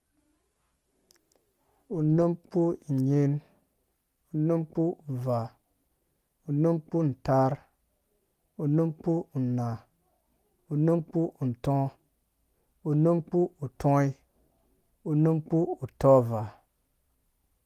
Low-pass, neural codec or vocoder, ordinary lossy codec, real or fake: 14.4 kHz; none; Opus, 24 kbps; real